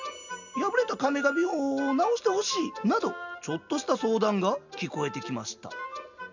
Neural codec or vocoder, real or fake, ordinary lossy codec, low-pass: none; real; none; 7.2 kHz